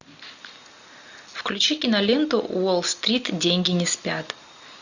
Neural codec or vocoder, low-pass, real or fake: none; 7.2 kHz; real